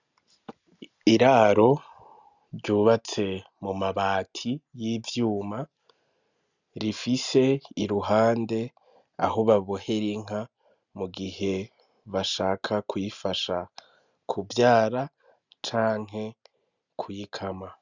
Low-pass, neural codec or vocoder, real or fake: 7.2 kHz; none; real